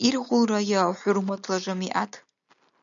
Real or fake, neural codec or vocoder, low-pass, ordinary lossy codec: real; none; 7.2 kHz; MP3, 96 kbps